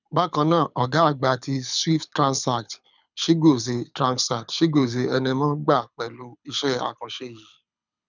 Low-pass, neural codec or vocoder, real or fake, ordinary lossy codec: 7.2 kHz; codec, 24 kHz, 6 kbps, HILCodec; fake; none